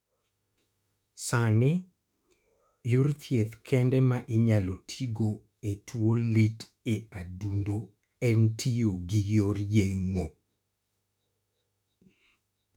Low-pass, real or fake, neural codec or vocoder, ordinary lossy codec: 19.8 kHz; fake; autoencoder, 48 kHz, 32 numbers a frame, DAC-VAE, trained on Japanese speech; none